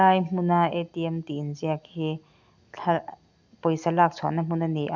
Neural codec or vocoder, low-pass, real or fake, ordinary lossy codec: none; 7.2 kHz; real; none